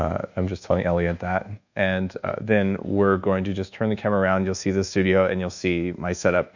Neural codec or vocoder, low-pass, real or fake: codec, 24 kHz, 1.2 kbps, DualCodec; 7.2 kHz; fake